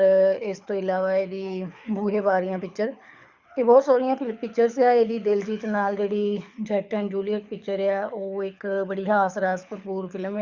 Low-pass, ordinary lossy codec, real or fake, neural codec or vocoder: 7.2 kHz; Opus, 64 kbps; fake; codec, 24 kHz, 6 kbps, HILCodec